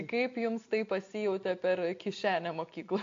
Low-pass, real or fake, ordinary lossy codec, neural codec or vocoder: 7.2 kHz; real; AAC, 48 kbps; none